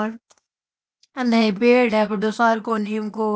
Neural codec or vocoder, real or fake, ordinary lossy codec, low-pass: codec, 16 kHz, 0.8 kbps, ZipCodec; fake; none; none